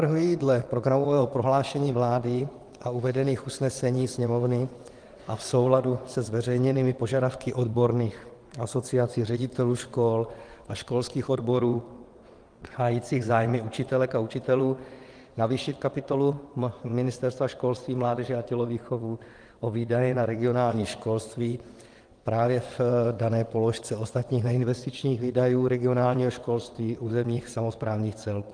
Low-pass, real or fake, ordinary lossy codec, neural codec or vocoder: 9.9 kHz; fake; Opus, 24 kbps; vocoder, 22.05 kHz, 80 mel bands, WaveNeXt